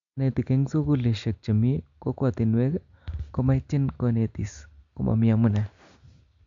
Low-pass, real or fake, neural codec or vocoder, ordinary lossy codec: 7.2 kHz; real; none; AAC, 64 kbps